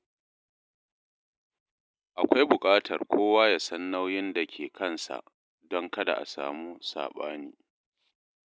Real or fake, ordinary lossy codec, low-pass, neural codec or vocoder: real; none; none; none